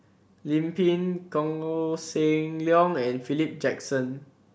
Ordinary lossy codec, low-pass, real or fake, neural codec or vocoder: none; none; real; none